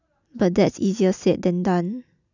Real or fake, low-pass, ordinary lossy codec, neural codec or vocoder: real; 7.2 kHz; none; none